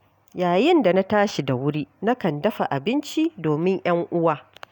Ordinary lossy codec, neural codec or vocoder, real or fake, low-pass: none; none; real; 19.8 kHz